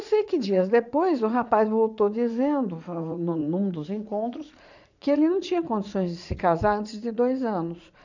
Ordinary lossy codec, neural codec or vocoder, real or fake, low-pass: none; vocoder, 44.1 kHz, 80 mel bands, Vocos; fake; 7.2 kHz